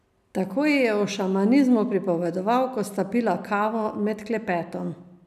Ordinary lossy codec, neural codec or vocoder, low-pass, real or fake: none; none; 14.4 kHz; real